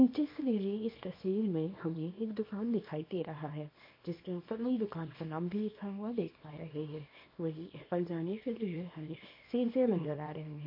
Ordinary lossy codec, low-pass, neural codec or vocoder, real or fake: MP3, 32 kbps; 5.4 kHz; codec, 24 kHz, 0.9 kbps, WavTokenizer, small release; fake